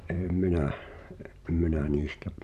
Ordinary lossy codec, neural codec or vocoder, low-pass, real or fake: none; vocoder, 44.1 kHz, 128 mel bands every 512 samples, BigVGAN v2; 14.4 kHz; fake